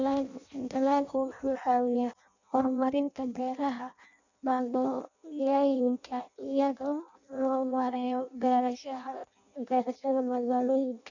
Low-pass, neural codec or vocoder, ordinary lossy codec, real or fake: 7.2 kHz; codec, 16 kHz in and 24 kHz out, 0.6 kbps, FireRedTTS-2 codec; none; fake